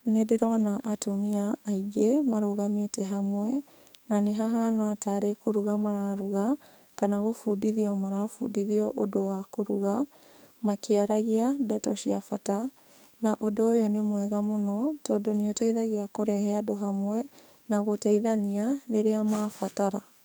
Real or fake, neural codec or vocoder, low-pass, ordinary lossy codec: fake; codec, 44.1 kHz, 2.6 kbps, SNAC; none; none